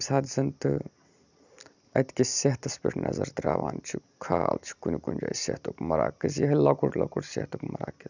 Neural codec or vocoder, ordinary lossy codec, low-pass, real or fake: none; none; 7.2 kHz; real